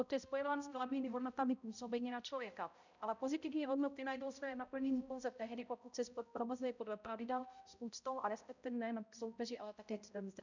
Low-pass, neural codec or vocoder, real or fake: 7.2 kHz; codec, 16 kHz, 0.5 kbps, X-Codec, HuBERT features, trained on balanced general audio; fake